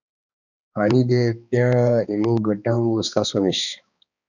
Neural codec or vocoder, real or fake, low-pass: codec, 16 kHz, 2 kbps, X-Codec, HuBERT features, trained on balanced general audio; fake; 7.2 kHz